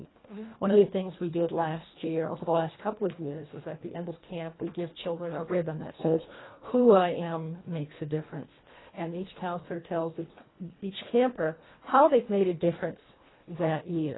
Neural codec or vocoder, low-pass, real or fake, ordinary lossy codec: codec, 24 kHz, 1.5 kbps, HILCodec; 7.2 kHz; fake; AAC, 16 kbps